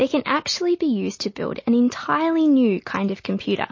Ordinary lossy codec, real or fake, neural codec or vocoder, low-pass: MP3, 32 kbps; real; none; 7.2 kHz